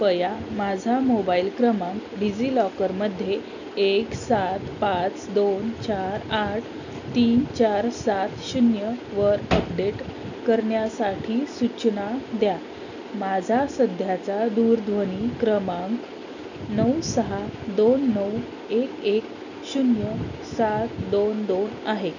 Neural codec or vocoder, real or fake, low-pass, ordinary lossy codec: none; real; 7.2 kHz; none